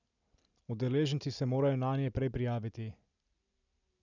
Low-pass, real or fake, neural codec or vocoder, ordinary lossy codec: 7.2 kHz; real; none; none